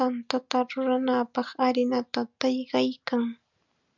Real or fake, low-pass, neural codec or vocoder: real; 7.2 kHz; none